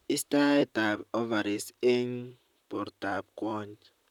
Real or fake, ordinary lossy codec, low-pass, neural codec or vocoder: fake; none; 19.8 kHz; vocoder, 44.1 kHz, 128 mel bands, Pupu-Vocoder